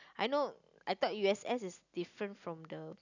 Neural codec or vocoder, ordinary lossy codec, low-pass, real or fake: none; none; 7.2 kHz; real